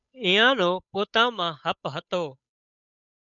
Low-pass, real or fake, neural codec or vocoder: 7.2 kHz; fake; codec, 16 kHz, 8 kbps, FunCodec, trained on Chinese and English, 25 frames a second